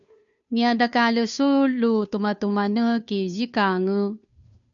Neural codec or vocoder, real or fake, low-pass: codec, 16 kHz, 2 kbps, FunCodec, trained on Chinese and English, 25 frames a second; fake; 7.2 kHz